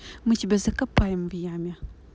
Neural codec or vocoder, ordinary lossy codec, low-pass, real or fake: none; none; none; real